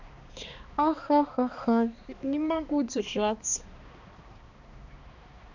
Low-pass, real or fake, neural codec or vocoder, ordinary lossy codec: 7.2 kHz; fake; codec, 16 kHz, 2 kbps, X-Codec, HuBERT features, trained on balanced general audio; none